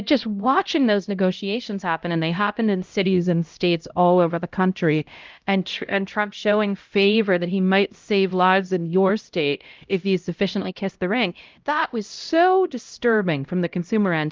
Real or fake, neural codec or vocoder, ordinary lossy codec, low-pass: fake; codec, 16 kHz, 0.5 kbps, X-Codec, HuBERT features, trained on LibriSpeech; Opus, 32 kbps; 7.2 kHz